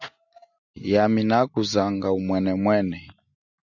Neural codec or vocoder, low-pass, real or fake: none; 7.2 kHz; real